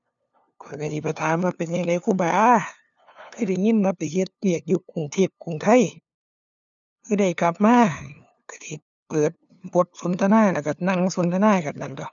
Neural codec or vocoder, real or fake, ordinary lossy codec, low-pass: codec, 16 kHz, 2 kbps, FunCodec, trained on LibriTTS, 25 frames a second; fake; none; 7.2 kHz